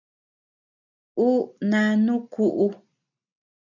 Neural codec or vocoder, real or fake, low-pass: none; real; 7.2 kHz